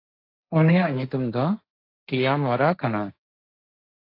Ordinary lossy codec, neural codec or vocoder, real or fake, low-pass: AAC, 32 kbps; codec, 16 kHz, 1.1 kbps, Voila-Tokenizer; fake; 5.4 kHz